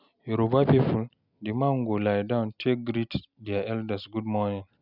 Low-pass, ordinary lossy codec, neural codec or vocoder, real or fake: 5.4 kHz; none; none; real